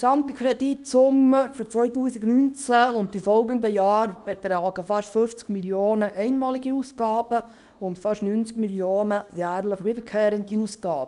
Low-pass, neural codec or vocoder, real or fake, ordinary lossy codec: 10.8 kHz; codec, 24 kHz, 0.9 kbps, WavTokenizer, small release; fake; none